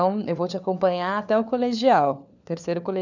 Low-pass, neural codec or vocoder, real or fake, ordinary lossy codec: 7.2 kHz; codec, 16 kHz, 4 kbps, FunCodec, trained on LibriTTS, 50 frames a second; fake; none